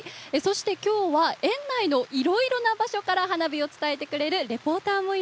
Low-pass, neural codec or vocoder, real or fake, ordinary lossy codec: none; none; real; none